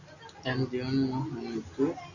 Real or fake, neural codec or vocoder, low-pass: real; none; 7.2 kHz